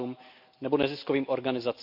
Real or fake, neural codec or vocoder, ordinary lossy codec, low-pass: real; none; MP3, 48 kbps; 5.4 kHz